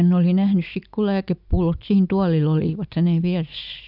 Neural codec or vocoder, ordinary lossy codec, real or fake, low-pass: none; none; real; 5.4 kHz